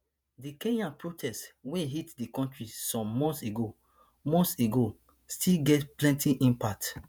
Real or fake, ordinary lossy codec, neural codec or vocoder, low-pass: fake; none; vocoder, 48 kHz, 128 mel bands, Vocos; none